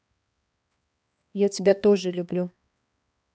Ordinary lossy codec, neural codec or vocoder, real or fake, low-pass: none; codec, 16 kHz, 2 kbps, X-Codec, HuBERT features, trained on balanced general audio; fake; none